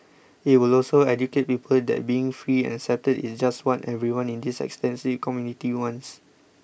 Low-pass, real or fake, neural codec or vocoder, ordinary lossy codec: none; real; none; none